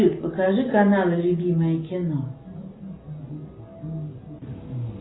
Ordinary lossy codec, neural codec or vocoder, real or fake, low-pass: AAC, 16 kbps; none; real; 7.2 kHz